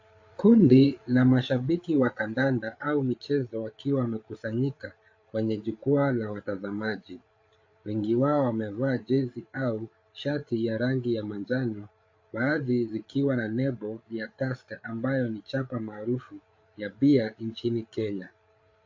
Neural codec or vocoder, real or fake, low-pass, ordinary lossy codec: codec, 16 kHz, 8 kbps, FreqCodec, larger model; fake; 7.2 kHz; AAC, 48 kbps